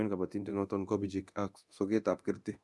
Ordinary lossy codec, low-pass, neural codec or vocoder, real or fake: none; none; codec, 24 kHz, 0.9 kbps, DualCodec; fake